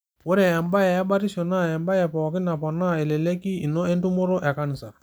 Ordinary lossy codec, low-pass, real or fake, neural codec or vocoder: none; none; real; none